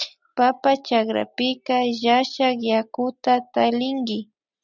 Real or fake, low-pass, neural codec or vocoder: real; 7.2 kHz; none